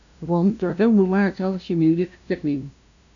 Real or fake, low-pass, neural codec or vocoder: fake; 7.2 kHz; codec, 16 kHz, 0.5 kbps, FunCodec, trained on LibriTTS, 25 frames a second